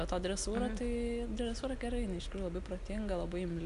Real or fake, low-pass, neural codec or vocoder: real; 10.8 kHz; none